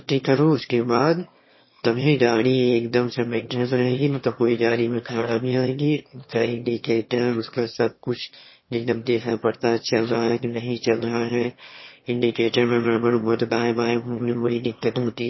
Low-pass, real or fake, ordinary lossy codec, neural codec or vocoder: 7.2 kHz; fake; MP3, 24 kbps; autoencoder, 22.05 kHz, a latent of 192 numbers a frame, VITS, trained on one speaker